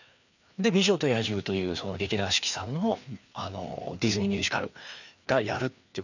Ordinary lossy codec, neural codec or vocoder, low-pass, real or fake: none; codec, 16 kHz, 2 kbps, FreqCodec, larger model; 7.2 kHz; fake